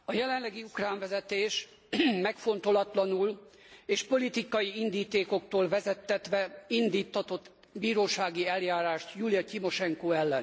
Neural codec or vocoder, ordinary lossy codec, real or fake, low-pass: none; none; real; none